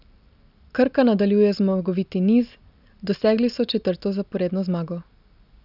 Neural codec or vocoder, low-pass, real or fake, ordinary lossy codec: none; 5.4 kHz; real; none